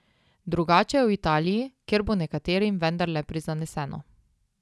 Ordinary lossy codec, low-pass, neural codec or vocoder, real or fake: none; none; none; real